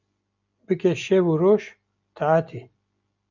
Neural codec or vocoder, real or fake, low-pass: none; real; 7.2 kHz